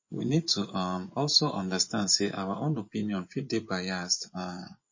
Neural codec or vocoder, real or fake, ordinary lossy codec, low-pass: vocoder, 24 kHz, 100 mel bands, Vocos; fake; MP3, 32 kbps; 7.2 kHz